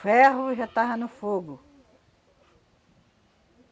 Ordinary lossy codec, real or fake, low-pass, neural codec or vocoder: none; real; none; none